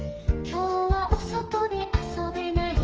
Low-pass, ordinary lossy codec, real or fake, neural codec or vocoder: 7.2 kHz; Opus, 24 kbps; fake; codec, 32 kHz, 1.9 kbps, SNAC